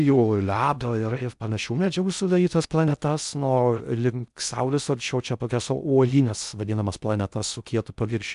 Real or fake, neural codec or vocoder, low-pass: fake; codec, 16 kHz in and 24 kHz out, 0.6 kbps, FocalCodec, streaming, 2048 codes; 10.8 kHz